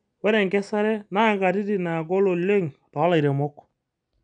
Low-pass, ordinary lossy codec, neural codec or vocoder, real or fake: 9.9 kHz; none; none; real